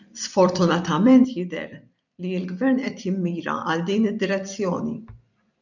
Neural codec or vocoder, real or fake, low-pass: vocoder, 44.1 kHz, 128 mel bands every 256 samples, BigVGAN v2; fake; 7.2 kHz